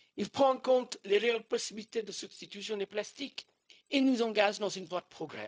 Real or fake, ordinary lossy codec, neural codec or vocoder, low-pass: fake; none; codec, 16 kHz, 0.4 kbps, LongCat-Audio-Codec; none